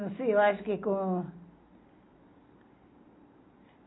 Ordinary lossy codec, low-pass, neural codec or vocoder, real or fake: AAC, 16 kbps; 7.2 kHz; none; real